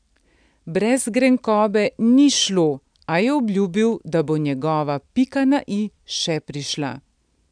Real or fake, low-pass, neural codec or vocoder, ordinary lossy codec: real; 9.9 kHz; none; none